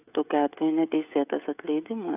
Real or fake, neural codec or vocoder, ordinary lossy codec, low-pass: fake; codec, 16 kHz, 16 kbps, FreqCodec, smaller model; AAC, 32 kbps; 3.6 kHz